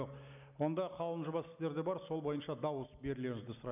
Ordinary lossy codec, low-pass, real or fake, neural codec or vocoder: none; 3.6 kHz; real; none